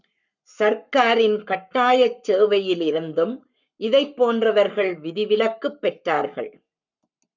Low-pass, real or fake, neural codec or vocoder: 7.2 kHz; fake; codec, 44.1 kHz, 7.8 kbps, Pupu-Codec